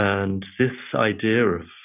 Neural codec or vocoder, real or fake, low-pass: none; real; 3.6 kHz